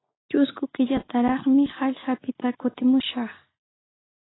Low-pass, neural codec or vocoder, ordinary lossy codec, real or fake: 7.2 kHz; none; AAC, 16 kbps; real